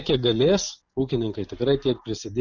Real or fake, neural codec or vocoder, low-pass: real; none; 7.2 kHz